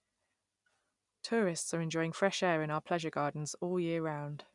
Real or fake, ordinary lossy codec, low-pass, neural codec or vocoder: real; none; 10.8 kHz; none